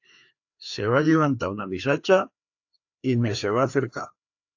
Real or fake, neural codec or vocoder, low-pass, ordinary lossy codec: fake; codec, 16 kHz, 2 kbps, FreqCodec, larger model; 7.2 kHz; AAC, 48 kbps